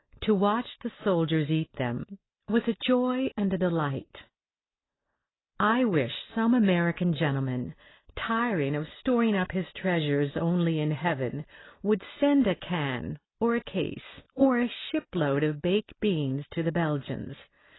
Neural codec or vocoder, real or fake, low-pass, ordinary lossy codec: none; real; 7.2 kHz; AAC, 16 kbps